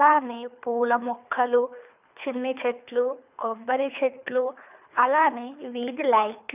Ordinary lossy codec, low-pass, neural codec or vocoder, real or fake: none; 3.6 kHz; codec, 24 kHz, 3 kbps, HILCodec; fake